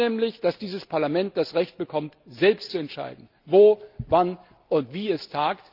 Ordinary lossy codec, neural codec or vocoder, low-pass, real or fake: Opus, 24 kbps; none; 5.4 kHz; real